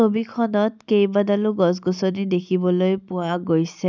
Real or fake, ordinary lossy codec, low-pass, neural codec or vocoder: real; none; 7.2 kHz; none